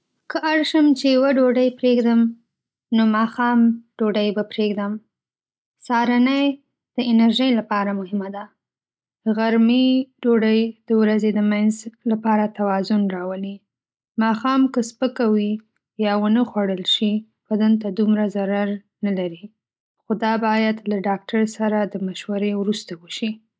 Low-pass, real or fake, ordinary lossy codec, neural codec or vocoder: none; real; none; none